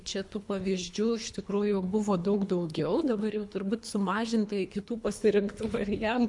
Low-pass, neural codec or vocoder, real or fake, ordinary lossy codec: 10.8 kHz; codec, 24 kHz, 3 kbps, HILCodec; fake; MP3, 64 kbps